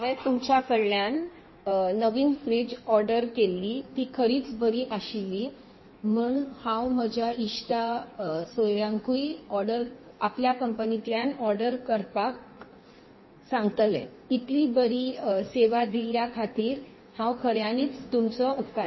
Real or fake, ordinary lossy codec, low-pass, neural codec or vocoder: fake; MP3, 24 kbps; 7.2 kHz; codec, 16 kHz in and 24 kHz out, 1.1 kbps, FireRedTTS-2 codec